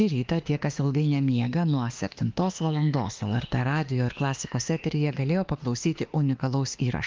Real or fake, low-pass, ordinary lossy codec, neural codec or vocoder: fake; 7.2 kHz; Opus, 32 kbps; autoencoder, 48 kHz, 32 numbers a frame, DAC-VAE, trained on Japanese speech